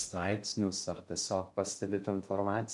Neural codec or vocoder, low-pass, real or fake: codec, 16 kHz in and 24 kHz out, 0.6 kbps, FocalCodec, streaming, 2048 codes; 10.8 kHz; fake